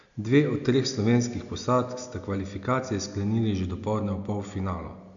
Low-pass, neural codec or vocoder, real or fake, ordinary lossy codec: 7.2 kHz; none; real; none